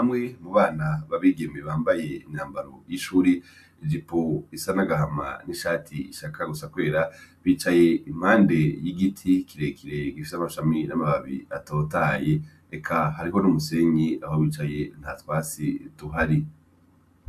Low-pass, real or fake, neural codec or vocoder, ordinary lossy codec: 14.4 kHz; real; none; AAC, 96 kbps